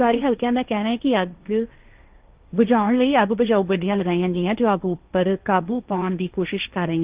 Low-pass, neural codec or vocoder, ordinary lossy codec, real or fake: 3.6 kHz; codec, 16 kHz, 1.1 kbps, Voila-Tokenizer; Opus, 24 kbps; fake